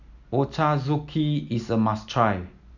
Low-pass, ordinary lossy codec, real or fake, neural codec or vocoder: 7.2 kHz; none; real; none